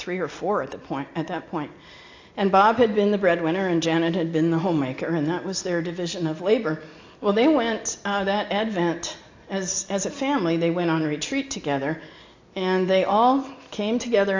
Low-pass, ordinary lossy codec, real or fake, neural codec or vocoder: 7.2 kHz; AAC, 48 kbps; real; none